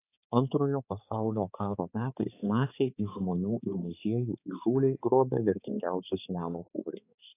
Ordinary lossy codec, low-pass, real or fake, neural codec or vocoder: AAC, 24 kbps; 3.6 kHz; fake; codec, 16 kHz, 4 kbps, X-Codec, HuBERT features, trained on balanced general audio